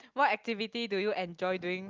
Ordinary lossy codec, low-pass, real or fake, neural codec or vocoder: Opus, 32 kbps; 7.2 kHz; real; none